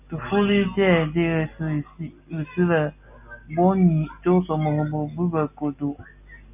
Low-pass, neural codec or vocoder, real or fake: 3.6 kHz; none; real